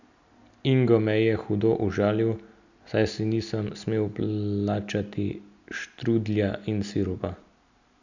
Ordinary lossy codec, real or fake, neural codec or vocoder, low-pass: none; real; none; 7.2 kHz